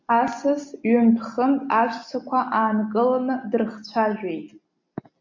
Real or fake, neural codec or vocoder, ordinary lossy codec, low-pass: real; none; MP3, 64 kbps; 7.2 kHz